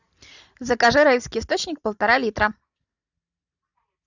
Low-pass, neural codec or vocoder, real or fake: 7.2 kHz; none; real